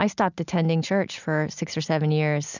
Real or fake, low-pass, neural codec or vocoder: real; 7.2 kHz; none